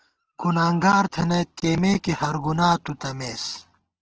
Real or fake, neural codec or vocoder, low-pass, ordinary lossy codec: real; none; 7.2 kHz; Opus, 16 kbps